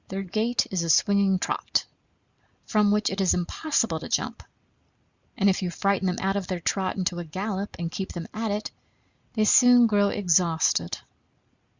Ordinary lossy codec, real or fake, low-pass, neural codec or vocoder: Opus, 64 kbps; real; 7.2 kHz; none